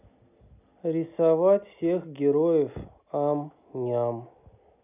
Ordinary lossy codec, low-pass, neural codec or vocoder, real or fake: none; 3.6 kHz; none; real